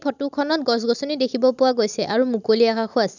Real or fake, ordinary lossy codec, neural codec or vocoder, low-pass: real; none; none; 7.2 kHz